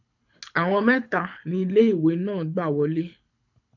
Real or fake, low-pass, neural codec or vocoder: fake; 7.2 kHz; codec, 24 kHz, 6 kbps, HILCodec